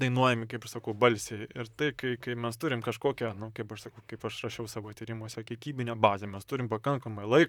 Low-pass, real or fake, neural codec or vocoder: 19.8 kHz; fake; vocoder, 44.1 kHz, 128 mel bands, Pupu-Vocoder